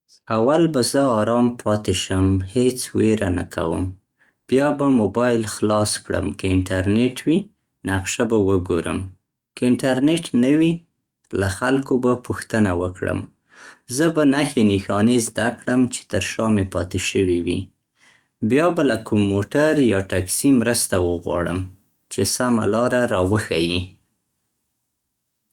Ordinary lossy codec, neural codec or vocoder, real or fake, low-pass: Opus, 64 kbps; codec, 44.1 kHz, 7.8 kbps, DAC; fake; 19.8 kHz